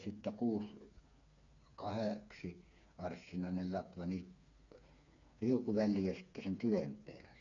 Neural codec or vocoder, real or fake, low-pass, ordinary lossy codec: codec, 16 kHz, 4 kbps, FreqCodec, smaller model; fake; 7.2 kHz; none